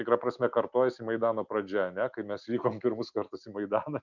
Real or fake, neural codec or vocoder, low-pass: real; none; 7.2 kHz